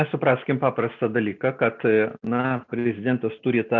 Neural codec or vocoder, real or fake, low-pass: none; real; 7.2 kHz